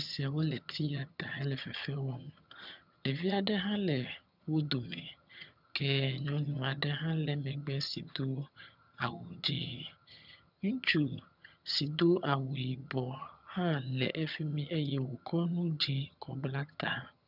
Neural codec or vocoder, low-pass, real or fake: vocoder, 22.05 kHz, 80 mel bands, HiFi-GAN; 5.4 kHz; fake